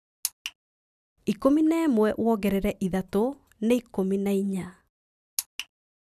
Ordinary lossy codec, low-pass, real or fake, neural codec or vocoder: none; 14.4 kHz; real; none